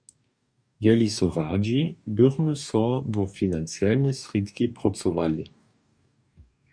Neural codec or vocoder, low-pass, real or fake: codec, 44.1 kHz, 2.6 kbps, DAC; 9.9 kHz; fake